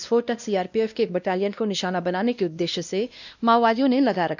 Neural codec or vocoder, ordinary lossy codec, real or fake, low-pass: codec, 16 kHz, 1 kbps, X-Codec, WavLM features, trained on Multilingual LibriSpeech; none; fake; 7.2 kHz